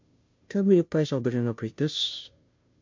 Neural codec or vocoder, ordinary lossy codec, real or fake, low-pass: codec, 16 kHz, 0.5 kbps, FunCodec, trained on Chinese and English, 25 frames a second; MP3, 48 kbps; fake; 7.2 kHz